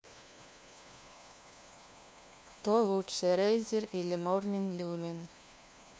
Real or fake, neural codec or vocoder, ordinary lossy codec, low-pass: fake; codec, 16 kHz, 1 kbps, FunCodec, trained on LibriTTS, 50 frames a second; none; none